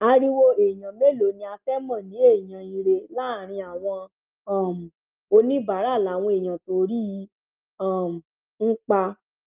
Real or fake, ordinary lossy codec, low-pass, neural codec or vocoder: real; Opus, 32 kbps; 3.6 kHz; none